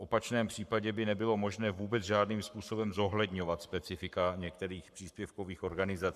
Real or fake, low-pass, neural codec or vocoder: fake; 14.4 kHz; codec, 44.1 kHz, 7.8 kbps, Pupu-Codec